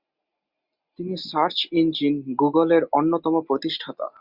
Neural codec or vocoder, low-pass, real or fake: none; 5.4 kHz; real